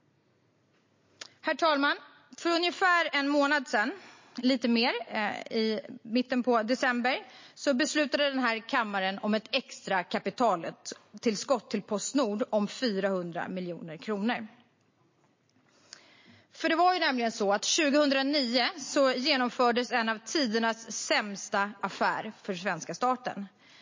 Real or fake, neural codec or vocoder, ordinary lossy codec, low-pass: real; none; MP3, 32 kbps; 7.2 kHz